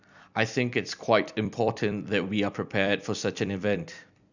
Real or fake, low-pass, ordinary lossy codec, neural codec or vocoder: fake; 7.2 kHz; none; vocoder, 44.1 kHz, 128 mel bands every 256 samples, BigVGAN v2